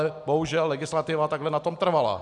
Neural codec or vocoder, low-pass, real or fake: none; 10.8 kHz; real